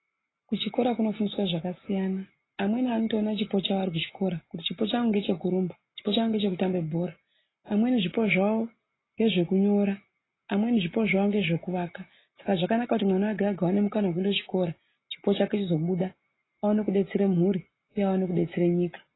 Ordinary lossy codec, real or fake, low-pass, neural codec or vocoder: AAC, 16 kbps; real; 7.2 kHz; none